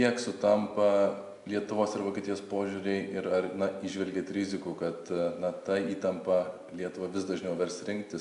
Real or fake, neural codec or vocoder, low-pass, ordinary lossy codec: real; none; 10.8 kHz; AAC, 96 kbps